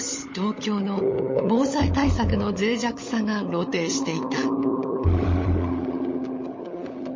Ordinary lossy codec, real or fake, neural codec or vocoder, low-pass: MP3, 32 kbps; fake; codec, 16 kHz, 16 kbps, FunCodec, trained on LibriTTS, 50 frames a second; 7.2 kHz